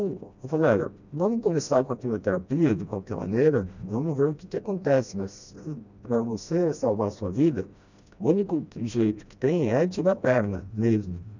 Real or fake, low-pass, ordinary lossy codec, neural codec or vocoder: fake; 7.2 kHz; none; codec, 16 kHz, 1 kbps, FreqCodec, smaller model